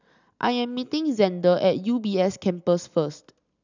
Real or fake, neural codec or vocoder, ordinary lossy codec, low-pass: real; none; none; 7.2 kHz